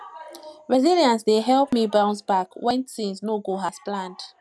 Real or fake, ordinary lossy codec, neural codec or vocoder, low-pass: real; none; none; none